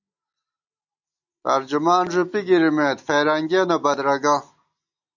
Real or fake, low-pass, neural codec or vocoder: real; 7.2 kHz; none